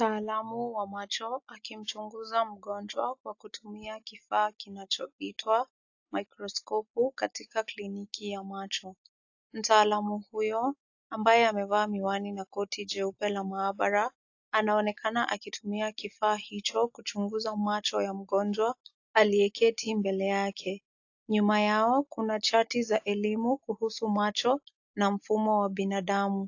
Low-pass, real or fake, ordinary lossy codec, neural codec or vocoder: 7.2 kHz; real; AAC, 48 kbps; none